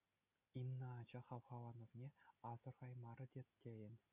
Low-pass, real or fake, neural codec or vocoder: 3.6 kHz; real; none